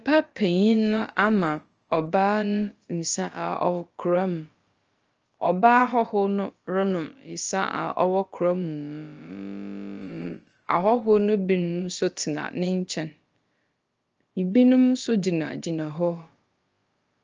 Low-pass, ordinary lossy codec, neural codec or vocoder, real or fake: 7.2 kHz; Opus, 24 kbps; codec, 16 kHz, about 1 kbps, DyCAST, with the encoder's durations; fake